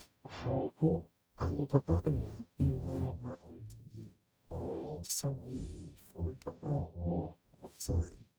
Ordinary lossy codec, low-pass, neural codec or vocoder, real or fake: none; none; codec, 44.1 kHz, 0.9 kbps, DAC; fake